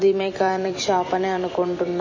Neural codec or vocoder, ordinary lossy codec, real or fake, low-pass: vocoder, 44.1 kHz, 128 mel bands every 256 samples, BigVGAN v2; MP3, 32 kbps; fake; 7.2 kHz